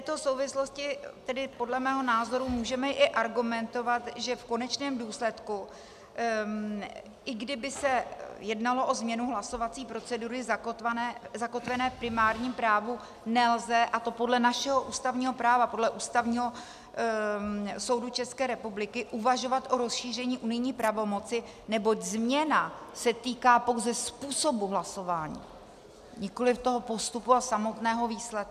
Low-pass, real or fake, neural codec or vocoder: 14.4 kHz; real; none